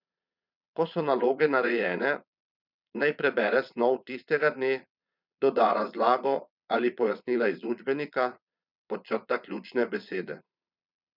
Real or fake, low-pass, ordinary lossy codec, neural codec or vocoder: fake; 5.4 kHz; none; vocoder, 22.05 kHz, 80 mel bands, Vocos